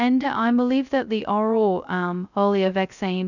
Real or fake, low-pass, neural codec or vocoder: fake; 7.2 kHz; codec, 16 kHz, 0.2 kbps, FocalCodec